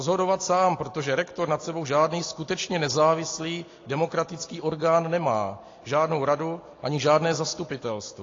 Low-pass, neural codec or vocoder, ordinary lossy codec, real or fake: 7.2 kHz; none; AAC, 32 kbps; real